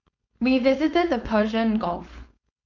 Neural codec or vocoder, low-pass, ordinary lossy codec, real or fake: codec, 16 kHz, 4.8 kbps, FACodec; 7.2 kHz; none; fake